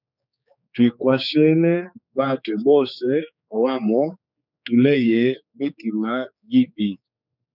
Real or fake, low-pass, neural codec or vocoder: fake; 5.4 kHz; codec, 16 kHz, 4 kbps, X-Codec, HuBERT features, trained on general audio